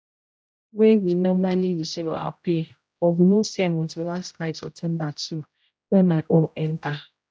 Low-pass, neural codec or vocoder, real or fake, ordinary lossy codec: none; codec, 16 kHz, 0.5 kbps, X-Codec, HuBERT features, trained on general audio; fake; none